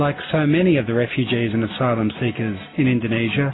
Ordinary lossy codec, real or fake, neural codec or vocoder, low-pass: AAC, 16 kbps; real; none; 7.2 kHz